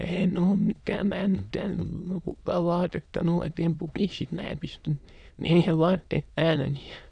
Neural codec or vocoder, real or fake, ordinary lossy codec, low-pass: autoencoder, 22.05 kHz, a latent of 192 numbers a frame, VITS, trained on many speakers; fake; none; 9.9 kHz